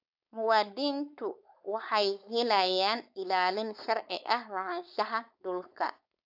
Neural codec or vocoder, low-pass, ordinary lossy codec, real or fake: codec, 16 kHz, 4.8 kbps, FACodec; 5.4 kHz; AAC, 48 kbps; fake